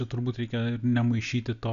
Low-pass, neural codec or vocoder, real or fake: 7.2 kHz; none; real